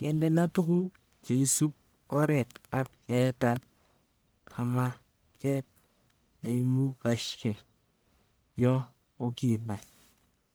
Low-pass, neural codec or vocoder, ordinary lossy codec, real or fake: none; codec, 44.1 kHz, 1.7 kbps, Pupu-Codec; none; fake